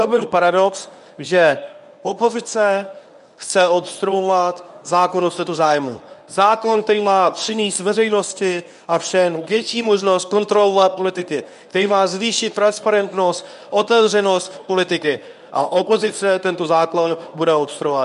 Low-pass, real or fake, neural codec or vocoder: 10.8 kHz; fake; codec, 24 kHz, 0.9 kbps, WavTokenizer, medium speech release version 1